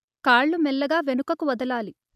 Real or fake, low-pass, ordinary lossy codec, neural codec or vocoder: real; 14.4 kHz; none; none